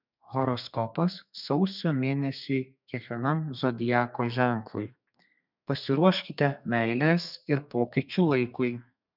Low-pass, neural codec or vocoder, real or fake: 5.4 kHz; codec, 32 kHz, 1.9 kbps, SNAC; fake